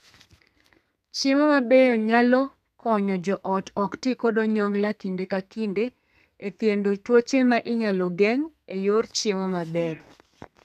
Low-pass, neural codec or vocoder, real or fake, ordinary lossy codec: 14.4 kHz; codec, 32 kHz, 1.9 kbps, SNAC; fake; none